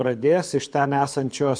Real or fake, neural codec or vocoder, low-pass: fake; codec, 24 kHz, 6 kbps, HILCodec; 9.9 kHz